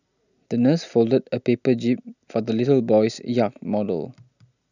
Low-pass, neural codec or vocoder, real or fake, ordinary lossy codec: 7.2 kHz; none; real; none